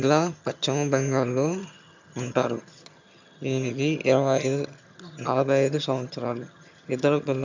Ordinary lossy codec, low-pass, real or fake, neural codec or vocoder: MP3, 64 kbps; 7.2 kHz; fake; vocoder, 22.05 kHz, 80 mel bands, HiFi-GAN